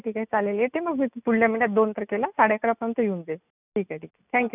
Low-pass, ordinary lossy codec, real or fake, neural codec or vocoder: 3.6 kHz; none; real; none